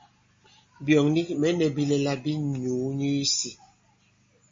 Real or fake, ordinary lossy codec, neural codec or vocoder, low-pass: real; MP3, 32 kbps; none; 7.2 kHz